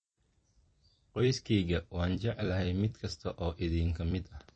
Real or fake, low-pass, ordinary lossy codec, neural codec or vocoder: fake; 9.9 kHz; MP3, 32 kbps; vocoder, 44.1 kHz, 128 mel bands every 256 samples, BigVGAN v2